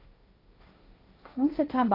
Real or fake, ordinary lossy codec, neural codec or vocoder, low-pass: fake; AAC, 24 kbps; codec, 16 kHz, 1.1 kbps, Voila-Tokenizer; 5.4 kHz